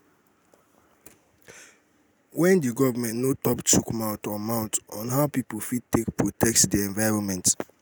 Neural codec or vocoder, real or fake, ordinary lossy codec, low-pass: none; real; none; none